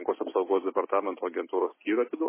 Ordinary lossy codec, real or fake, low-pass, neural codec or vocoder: MP3, 16 kbps; real; 3.6 kHz; none